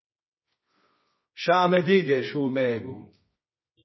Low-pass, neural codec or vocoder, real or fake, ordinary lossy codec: 7.2 kHz; codec, 24 kHz, 0.9 kbps, WavTokenizer, medium music audio release; fake; MP3, 24 kbps